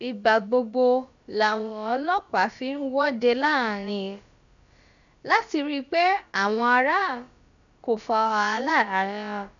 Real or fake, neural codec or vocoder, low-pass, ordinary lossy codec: fake; codec, 16 kHz, about 1 kbps, DyCAST, with the encoder's durations; 7.2 kHz; none